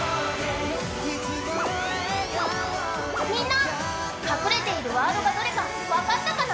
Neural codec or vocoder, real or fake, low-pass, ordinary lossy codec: none; real; none; none